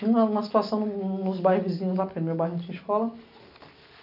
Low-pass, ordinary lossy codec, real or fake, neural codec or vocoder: 5.4 kHz; none; real; none